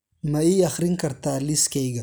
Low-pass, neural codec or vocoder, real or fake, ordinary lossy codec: none; none; real; none